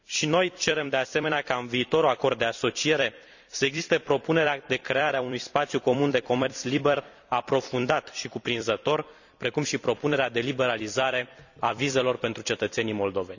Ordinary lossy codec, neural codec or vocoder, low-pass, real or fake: none; vocoder, 44.1 kHz, 128 mel bands every 256 samples, BigVGAN v2; 7.2 kHz; fake